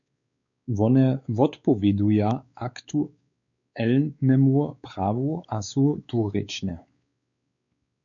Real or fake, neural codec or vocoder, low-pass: fake; codec, 16 kHz, 4 kbps, X-Codec, WavLM features, trained on Multilingual LibriSpeech; 7.2 kHz